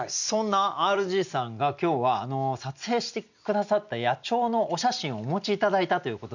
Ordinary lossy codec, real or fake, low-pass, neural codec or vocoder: none; real; 7.2 kHz; none